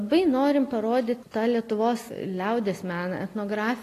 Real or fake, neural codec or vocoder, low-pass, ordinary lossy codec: real; none; 14.4 kHz; AAC, 48 kbps